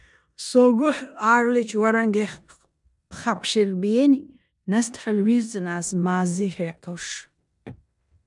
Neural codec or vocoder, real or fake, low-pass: codec, 16 kHz in and 24 kHz out, 0.9 kbps, LongCat-Audio-Codec, four codebook decoder; fake; 10.8 kHz